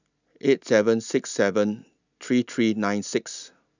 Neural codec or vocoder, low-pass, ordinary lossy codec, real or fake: none; 7.2 kHz; none; real